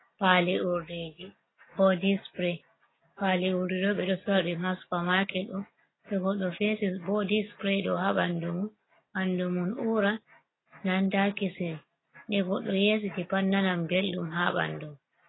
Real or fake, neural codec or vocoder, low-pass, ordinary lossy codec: real; none; 7.2 kHz; AAC, 16 kbps